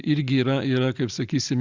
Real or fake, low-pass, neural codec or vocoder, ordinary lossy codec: real; 7.2 kHz; none; Opus, 64 kbps